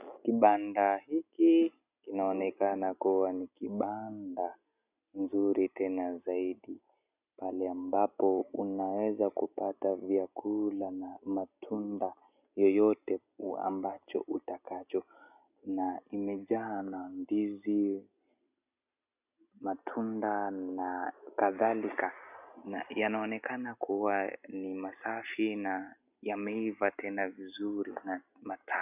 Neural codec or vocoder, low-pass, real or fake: none; 3.6 kHz; real